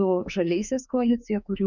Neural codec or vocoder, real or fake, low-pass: autoencoder, 48 kHz, 32 numbers a frame, DAC-VAE, trained on Japanese speech; fake; 7.2 kHz